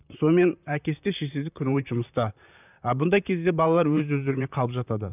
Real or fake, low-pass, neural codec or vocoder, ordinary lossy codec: fake; 3.6 kHz; vocoder, 44.1 kHz, 128 mel bands, Pupu-Vocoder; none